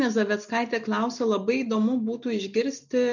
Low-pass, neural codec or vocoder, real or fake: 7.2 kHz; none; real